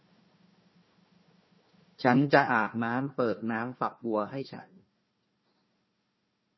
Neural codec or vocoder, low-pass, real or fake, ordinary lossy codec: codec, 16 kHz, 1 kbps, FunCodec, trained on Chinese and English, 50 frames a second; 7.2 kHz; fake; MP3, 24 kbps